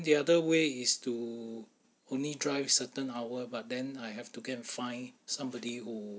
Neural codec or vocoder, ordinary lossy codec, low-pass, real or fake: none; none; none; real